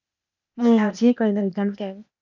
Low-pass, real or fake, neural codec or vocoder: 7.2 kHz; fake; codec, 16 kHz, 0.8 kbps, ZipCodec